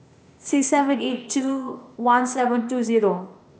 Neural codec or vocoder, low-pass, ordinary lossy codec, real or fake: codec, 16 kHz, 0.8 kbps, ZipCodec; none; none; fake